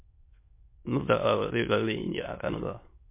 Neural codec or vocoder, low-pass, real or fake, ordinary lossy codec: autoencoder, 22.05 kHz, a latent of 192 numbers a frame, VITS, trained on many speakers; 3.6 kHz; fake; MP3, 24 kbps